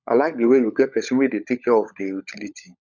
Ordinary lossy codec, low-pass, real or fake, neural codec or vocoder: none; 7.2 kHz; fake; codec, 16 kHz, 16 kbps, FunCodec, trained on LibriTTS, 50 frames a second